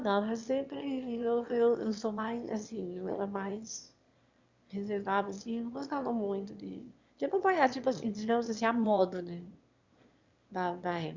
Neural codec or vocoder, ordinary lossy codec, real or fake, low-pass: autoencoder, 22.05 kHz, a latent of 192 numbers a frame, VITS, trained on one speaker; Opus, 64 kbps; fake; 7.2 kHz